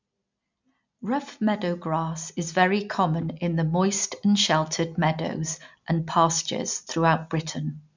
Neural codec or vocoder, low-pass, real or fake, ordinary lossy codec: none; 7.2 kHz; real; MP3, 64 kbps